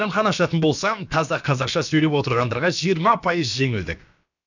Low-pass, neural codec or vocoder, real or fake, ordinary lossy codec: 7.2 kHz; codec, 16 kHz, about 1 kbps, DyCAST, with the encoder's durations; fake; none